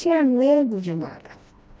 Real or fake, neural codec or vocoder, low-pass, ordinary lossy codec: fake; codec, 16 kHz, 1 kbps, FreqCodec, smaller model; none; none